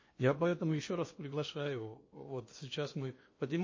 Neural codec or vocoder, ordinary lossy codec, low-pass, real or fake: codec, 16 kHz in and 24 kHz out, 0.8 kbps, FocalCodec, streaming, 65536 codes; MP3, 32 kbps; 7.2 kHz; fake